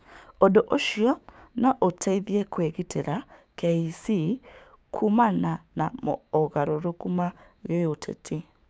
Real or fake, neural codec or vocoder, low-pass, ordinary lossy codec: fake; codec, 16 kHz, 6 kbps, DAC; none; none